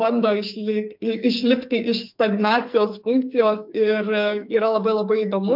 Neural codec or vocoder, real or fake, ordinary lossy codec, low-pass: codec, 44.1 kHz, 2.6 kbps, SNAC; fake; MP3, 48 kbps; 5.4 kHz